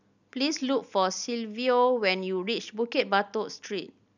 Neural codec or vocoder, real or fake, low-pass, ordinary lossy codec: none; real; 7.2 kHz; none